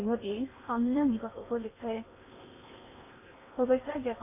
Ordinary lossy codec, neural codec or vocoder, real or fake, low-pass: none; codec, 16 kHz in and 24 kHz out, 0.8 kbps, FocalCodec, streaming, 65536 codes; fake; 3.6 kHz